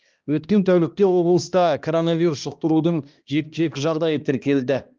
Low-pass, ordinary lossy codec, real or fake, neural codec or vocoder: 7.2 kHz; Opus, 24 kbps; fake; codec, 16 kHz, 1 kbps, X-Codec, HuBERT features, trained on balanced general audio